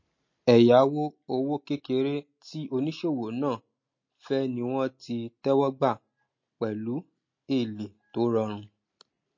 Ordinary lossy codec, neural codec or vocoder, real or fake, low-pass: MP3, 32 kbps; none; real; 7.2 kHz